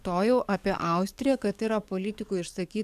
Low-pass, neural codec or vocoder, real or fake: 14.4 kHz; codec, 44.1 kHz, 7.8 kbps, DAC; fake